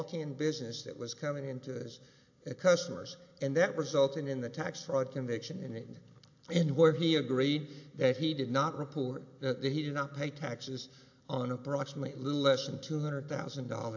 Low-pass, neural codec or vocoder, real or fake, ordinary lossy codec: 7.2 kHz; none; real; AAC, 48 kbps